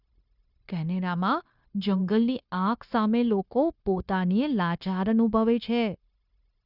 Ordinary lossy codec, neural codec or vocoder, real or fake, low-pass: Opus, 64 kbps; codec, 16 kHz, 0.9 kbps, LongCat-Audio-Codec; fake; 5.4 kHz